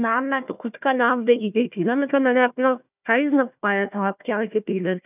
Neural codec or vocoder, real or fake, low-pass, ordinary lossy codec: codec, 16 kHz, 1 kbps, FunCodec, trained on Chinese and English, 50 frames a second; fake; 3.6 kHz; none